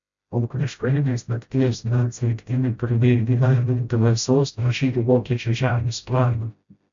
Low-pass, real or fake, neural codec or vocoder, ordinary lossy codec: 7.2 kHz; fake; codec, 16 kHz, 0.5 kbps, FreqCodec, smaller model; MP3, 64 kbps